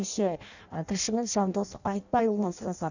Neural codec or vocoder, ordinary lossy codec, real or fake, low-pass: codec, 16 kHz in and 24 kHz out, 0.6 kbps, FireRedTTS-2 codec; none; fake; 7.2 kHz